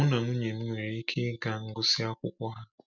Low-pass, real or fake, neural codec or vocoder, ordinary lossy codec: 7.2 kHz; real; none; none